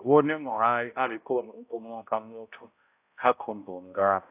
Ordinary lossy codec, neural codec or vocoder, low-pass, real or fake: AAC, 24 kbps; codec, 16 kHz, 0.5 kbps, X-Codec, HuBERT features, trained on balanced general audio; 3.6 kHz; fake